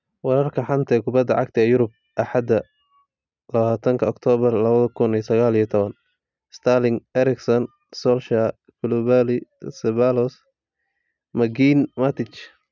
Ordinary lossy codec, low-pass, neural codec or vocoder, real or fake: none; 7.2 kHz; none; real